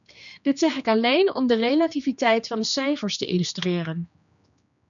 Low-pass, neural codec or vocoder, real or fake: 7.2 kHz; codec, 16 kHz, 2 kbps, X-Codec, HuBERT features, trained on general audio; fake